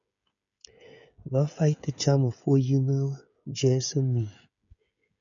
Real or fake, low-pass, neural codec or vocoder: fake; 7.2 kHz; codec, 16 kHz, 16 kbps, FreqCodec, smaller model